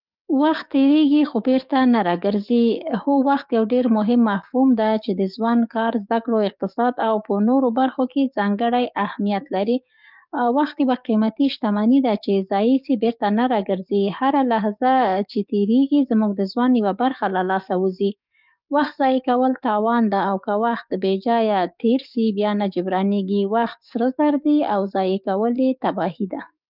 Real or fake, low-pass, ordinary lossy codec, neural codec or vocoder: fake; 5.4 kHz; none; codec, 44.1 kHz, 7.8 kbps, DAC